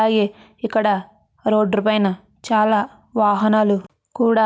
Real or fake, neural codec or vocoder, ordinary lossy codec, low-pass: real; none; none; none